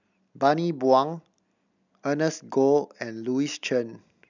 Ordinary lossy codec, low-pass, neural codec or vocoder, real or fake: none; 7.2 kHz; none; real